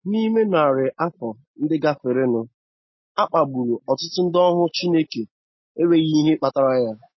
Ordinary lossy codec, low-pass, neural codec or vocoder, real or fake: MP3, 24 kbps; 7.2 kHz; none; real